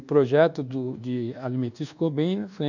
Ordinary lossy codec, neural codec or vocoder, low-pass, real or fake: none; codec, 24 kHz, 1.2 kbps, DualCodec; 7.2 kHz; fake